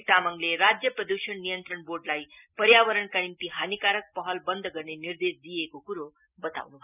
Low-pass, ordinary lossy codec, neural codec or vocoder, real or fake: 3.6 kHz; none; none; real